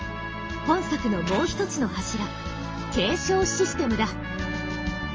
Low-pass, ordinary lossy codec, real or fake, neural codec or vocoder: 7.2 kHz; Opus, 24 kbps; real; none